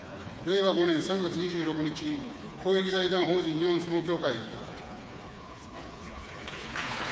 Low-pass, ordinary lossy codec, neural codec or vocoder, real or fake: none; none; codec, 16 kHz, 4 kbps, FreqCodec, smaller model; fake